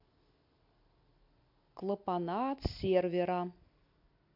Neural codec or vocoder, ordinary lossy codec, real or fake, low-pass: none; AAC, 48 kbps; real; 5.4 kHz